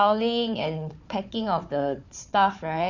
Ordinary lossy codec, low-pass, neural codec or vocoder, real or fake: none; 7.2 kHz; codec, 16 kHz, 4 kbps, FunCodec, trained on Chinese and English, 50 frames a second; fake